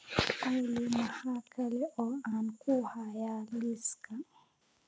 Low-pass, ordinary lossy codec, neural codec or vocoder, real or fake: none; none; none; real